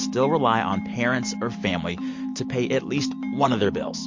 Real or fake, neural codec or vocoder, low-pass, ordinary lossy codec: real; none; 7.2 kHz; MP3, 48 kbps